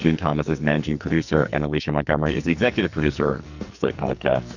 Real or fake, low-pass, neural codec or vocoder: fake; 7.2 kHz; codec, 44.1 kHz, 2.6 kbps, SNAC